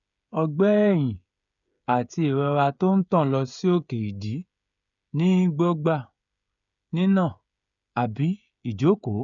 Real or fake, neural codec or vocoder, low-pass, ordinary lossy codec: fake; codec, 16 kHz, 16 kbps, FreqCodec, smaller model; 7.2 kHz; none